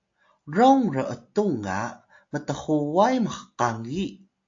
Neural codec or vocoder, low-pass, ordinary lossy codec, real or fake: none; 7.2 kHz; AAC, 48 kbps; real